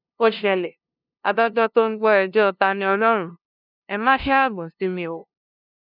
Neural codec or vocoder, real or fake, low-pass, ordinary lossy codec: codec, 16 kHz, 0.5 kbps, FunCodec, trained on LibriTTS, 25 frames a second; fake; 5.4 kHz; none